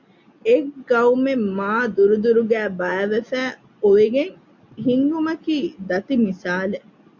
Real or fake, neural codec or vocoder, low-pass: real; none; 7.2 kHz